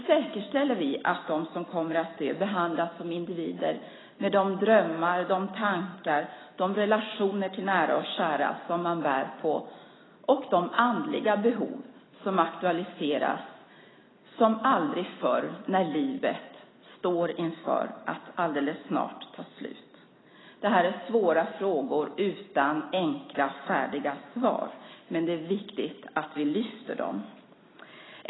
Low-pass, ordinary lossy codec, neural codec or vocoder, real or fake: 7.2 kHz; AAC, 16 kbps; none; real